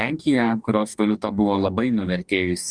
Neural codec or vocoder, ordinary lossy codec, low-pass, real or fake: codec, 44.1 kHz, 2.6 kbps, DAC; Opus, 64 kbps; 9.9 kHz; fake